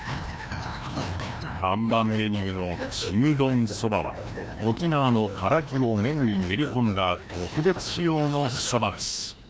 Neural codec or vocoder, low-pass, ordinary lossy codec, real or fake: codec, 16 kHz, 1 kbps, FreqCodec, larger model; none; none; fake